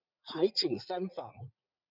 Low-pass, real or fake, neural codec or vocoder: 5.4 kHz; fake; vocoder, 44.1 kHz, 80 mel bands, Vocos